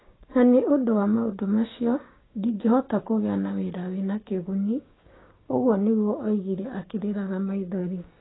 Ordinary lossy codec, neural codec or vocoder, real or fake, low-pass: AAC, 16 kbps; codec, 16 kHz, 6 kbps, DAC; fake; 7.2 kHz